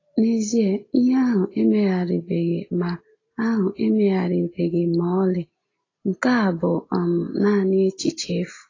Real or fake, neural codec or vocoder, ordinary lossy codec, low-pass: real; none; AAC, 32 kbps; 7.2 kHz